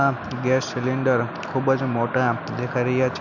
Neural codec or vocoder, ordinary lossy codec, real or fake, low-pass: none; none; real; 7.2 kHz